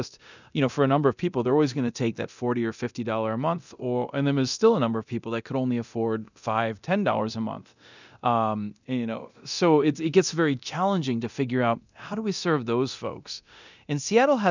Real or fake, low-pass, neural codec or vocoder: fake; 7.2 kHz; codec, 24 kHz, 0.9 kbps, DualCodec